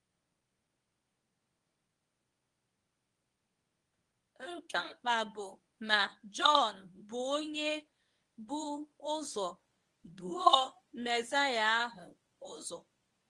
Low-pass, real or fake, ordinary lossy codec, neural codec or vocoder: 10.8 kHz; fake; Opus, 24 kbps; codec, 24 kHz, 0.9 kbps, WavTokenizer, medium speech release version 1